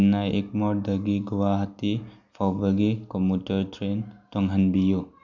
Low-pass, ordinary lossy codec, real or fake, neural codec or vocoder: 7.2 kHz; none; real; none